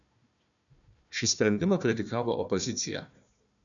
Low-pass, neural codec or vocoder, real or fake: 7.2 kHz; codec, 16 kHz, 1 kbps, FunCodec, trained on Chinese and English, 50 frames a second; fake